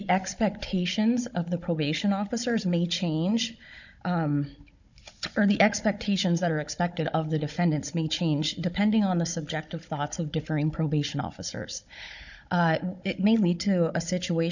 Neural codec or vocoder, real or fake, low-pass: codec, 16 kHz, 16 kbps, FunCodec, trained on Chinese and English, 50 frames a second; fake; 7.2 kHz